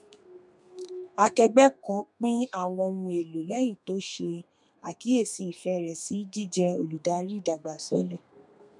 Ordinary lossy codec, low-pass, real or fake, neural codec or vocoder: none; 10.8 kHz; fake; codec, 32 kHz, 1.9 kbps, SNAC